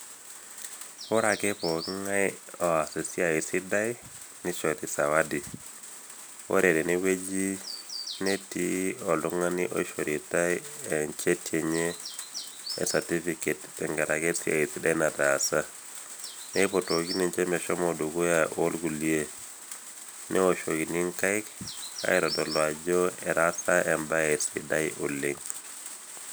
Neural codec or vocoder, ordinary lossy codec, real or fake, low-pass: none; none; real; none